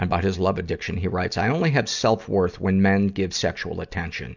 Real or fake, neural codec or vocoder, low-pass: real; none; 7.2 kHz